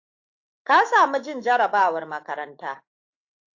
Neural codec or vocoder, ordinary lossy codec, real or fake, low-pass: autoencoder, 48 kHz, 128 numbers a frame, DAC-VAE, trained on Japanese speech; AAC, 48 kbps; fake; 7.2 kHz